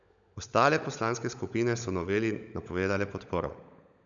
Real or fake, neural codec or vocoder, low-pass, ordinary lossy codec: fake; codec, 16 kHz, 16 kbps, FunCodec, trained on LibriTTS, 50 frames a second; 7.2 kHz; none